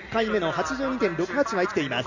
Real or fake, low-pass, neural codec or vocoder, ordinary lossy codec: real; 7.2 kHz; none; none